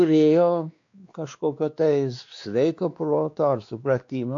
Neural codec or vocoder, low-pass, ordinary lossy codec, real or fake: codec, 16 kHz, 2 kbps, X-Codec, WavLM features, trained on Multilingual LibriSpeech; 7.2 kHz; AAC, 48 kbps; fake